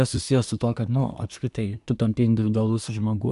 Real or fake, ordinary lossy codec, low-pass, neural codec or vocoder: fake; AAC, 96 kbps; 10.8 kHz; codec, 24 kHz, 1 kbps, SNAC